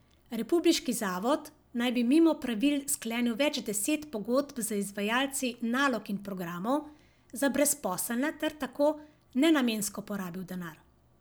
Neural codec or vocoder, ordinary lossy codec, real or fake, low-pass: none; none; real; none